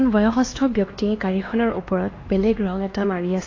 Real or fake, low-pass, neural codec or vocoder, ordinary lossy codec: fake; 7.2 kHz; codec, 16 kHz, 2 kbps, X-Codec, HuBERT features, trained on LibriSpeech; AAC, 32 kbps